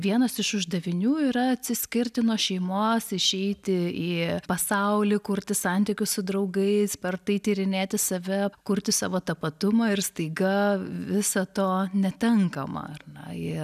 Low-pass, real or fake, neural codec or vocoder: 14.4 kHz; real; none